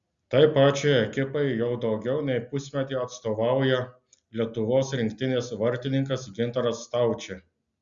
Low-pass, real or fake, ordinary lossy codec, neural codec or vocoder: 7.2 kHz; real; Opus, 64 kbps; none